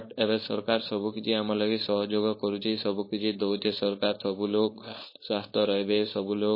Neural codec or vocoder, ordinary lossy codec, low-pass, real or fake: codec, 16 kHz, 4.8 kbps, FACodec; MP3, 24 kbps; 5.4 kHz; fake